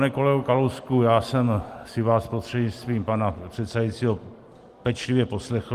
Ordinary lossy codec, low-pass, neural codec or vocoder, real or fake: Opus, 24 kbps; 14.4 kHz; none; real